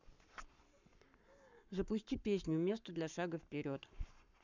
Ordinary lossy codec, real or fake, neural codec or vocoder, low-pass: none; fake; codec, 44.1 kHz, 7.8 kbps, Pupu-Codec; 7.2 kHz